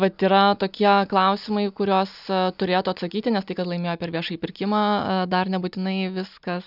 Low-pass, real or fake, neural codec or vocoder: 5.4 kHz; real; none